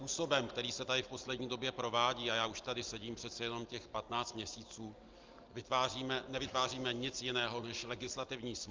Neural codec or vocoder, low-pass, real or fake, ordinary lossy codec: none; 7.2 kHz; real; Opus, 32 kbps